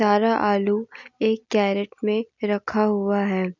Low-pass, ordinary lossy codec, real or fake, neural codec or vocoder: 7.2 kHz; none; real; none